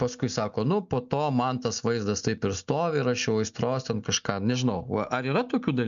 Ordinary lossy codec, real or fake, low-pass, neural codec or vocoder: MP3, 96 kbps; real; 7.2 kHz; none